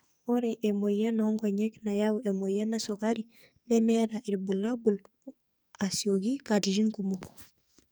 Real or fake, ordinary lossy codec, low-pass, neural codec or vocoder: fake; none; none; codec, 44.1 kHz, 2.6 kbps, SNAC